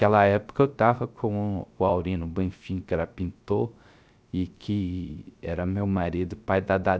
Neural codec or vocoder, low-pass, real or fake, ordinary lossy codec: codec, 16 kHz, 0.3 kbps, FocalCodec; none; fake; none